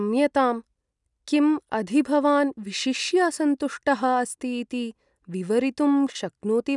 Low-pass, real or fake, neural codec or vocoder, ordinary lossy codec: 10.8 kHz; real; none; none